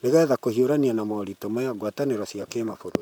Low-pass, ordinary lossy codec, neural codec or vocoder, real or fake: 19.8 kHz; none; vocoder, 44.1 kHz, 128 mel bands, Pupu-Vocoder; fake